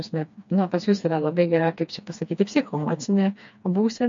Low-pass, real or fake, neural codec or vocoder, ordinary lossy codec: 7.2 kHz; fake; codec, 16 kHz, 2 kbps, FreqCodec, smaller model; MP3, 48 kbps